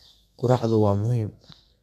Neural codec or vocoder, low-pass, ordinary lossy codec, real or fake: codec, 32 kHz, 1.9 kbps, SNAC; 14.4 kHz; none; fake